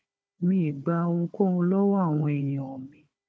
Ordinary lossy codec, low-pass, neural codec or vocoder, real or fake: none; none; codec, 16 kHz, 4 kbps, FunCodec, trained on Chinese and English, 50 frames a second; fake